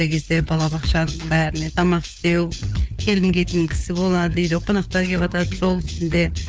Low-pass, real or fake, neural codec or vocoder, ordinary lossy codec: none; fake; codec, 16 kHz, 4 kbps, FunCodec, trained on LibriTTS, 50 frames a second; none